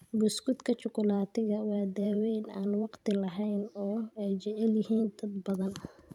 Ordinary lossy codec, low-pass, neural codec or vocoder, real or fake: none; 19.8 kHz; vocoder, 44.1 kHz, 128 mel bands every 512 samples, BigVGAN v2; fake